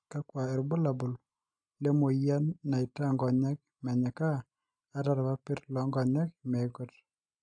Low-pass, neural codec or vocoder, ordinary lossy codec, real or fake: 9.9 kHz; none; AAC, 64 kbps; real